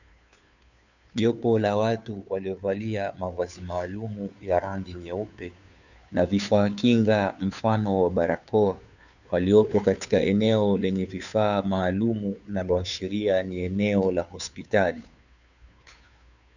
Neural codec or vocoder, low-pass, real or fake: codec, 16 kHz, 2 kbps, FunCodec, trained on Chinese and English, 25 frames a second; 7.2 kHz; fake